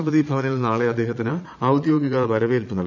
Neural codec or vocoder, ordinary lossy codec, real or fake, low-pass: vocoder, 22.05 kHz, 80 mel bands, Vocos; none; fake; 7.2 kHz